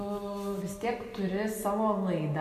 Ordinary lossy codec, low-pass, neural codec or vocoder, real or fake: MP3, 64 kbps; 14.4 kHz; none; real